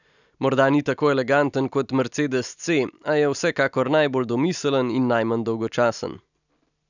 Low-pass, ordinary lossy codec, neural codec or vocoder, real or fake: 7.2 kHz; none; none; real